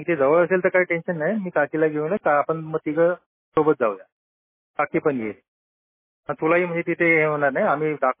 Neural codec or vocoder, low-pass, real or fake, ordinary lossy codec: none; 3.6 kHz; real; MP3, 16 kbps